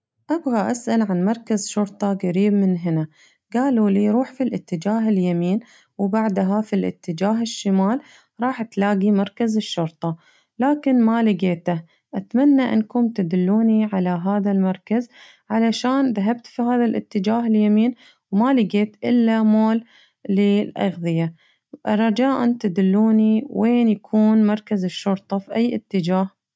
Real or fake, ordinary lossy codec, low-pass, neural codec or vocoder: real; none; none; none